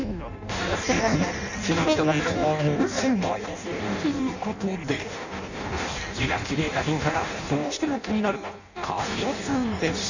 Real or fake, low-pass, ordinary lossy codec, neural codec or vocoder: fake; 7.2 kHz; none; codec, 16 kHz in and 24 kHz out, 0.6 kbps, FireRedTTS-2 codec